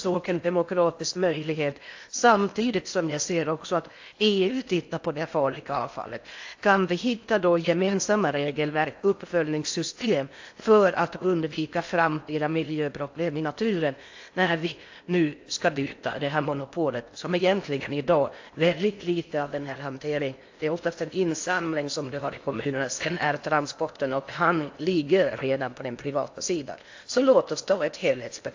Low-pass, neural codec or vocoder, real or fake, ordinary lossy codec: 7.2 kHz; codec, 16 kHz in and 24 kHz out, 0.8 kbps, FocalCodec, streaming, 65536 codes; fake; AAC, 48 kbps